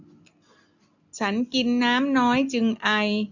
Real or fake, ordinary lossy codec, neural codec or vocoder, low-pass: real; none; none; 7.2 kHz